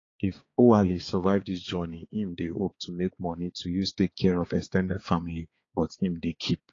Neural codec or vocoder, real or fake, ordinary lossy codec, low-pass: codec, 16 kHz, 4 kbps, X-Codec, HuBERT features, trained on balanced general audio; fake; AAC, 32 kbps; 7.2 kHz